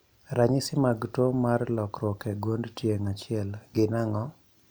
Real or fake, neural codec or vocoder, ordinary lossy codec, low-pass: real; none; none; none